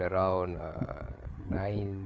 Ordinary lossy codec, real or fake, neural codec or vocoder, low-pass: none; fake; codec, 16 kHz, 8 kbps, FreqCodec, larger model; none